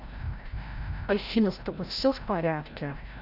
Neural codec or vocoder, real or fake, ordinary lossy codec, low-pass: codec, 16 kHz, 0.5 kbps, FreqCodec, larger model; fake; none; 5.4 kHz